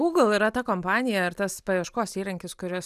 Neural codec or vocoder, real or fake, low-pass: none; real; 14.4 kHz